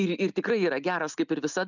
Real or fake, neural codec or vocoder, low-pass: real; none; 7.2 kHz